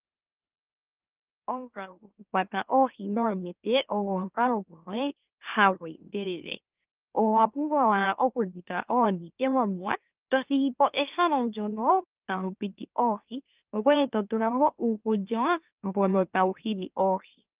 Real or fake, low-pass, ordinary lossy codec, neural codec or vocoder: fake; 3.6 kHz; Opus, 24 kbps; autoencoder, 44.1 kHz, a latent of 192 numbers a frame, MeloTTS